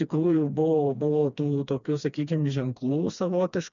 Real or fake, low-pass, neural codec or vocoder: fake; 7.2 kHz; codec, 16 kHz, 2 kbps, FreqCodec, smaller model